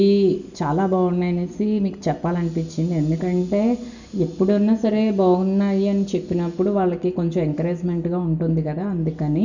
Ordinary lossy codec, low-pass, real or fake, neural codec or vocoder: none; 7.2 kHz; real; none